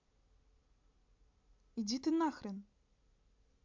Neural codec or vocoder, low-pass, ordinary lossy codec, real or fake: none; 7.2 kHz; MP3, 64 kbps; real